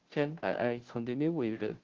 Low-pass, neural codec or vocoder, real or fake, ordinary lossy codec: 7.2 kHz; codec, 16 kHz, 0.5 kbps, FunCodec, trained on Chinese and English, 25 frames a second; fake; Opus, 16 kbps